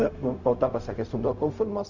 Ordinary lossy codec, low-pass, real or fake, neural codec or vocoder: none; 7.2 kHz; fake; codec, 16 kHz, 0.4 kbps, LongCat-Audio-Codec